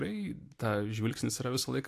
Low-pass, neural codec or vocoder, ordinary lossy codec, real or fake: 14.4 kHz; none; AAC, 64 kbps; real